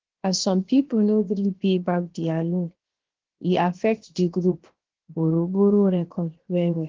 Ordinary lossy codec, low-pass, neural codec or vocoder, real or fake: Opus, 16 kbps; 7.2 kHz; codec, 16 kHz, 0.7 kbps, FocalCodec; fake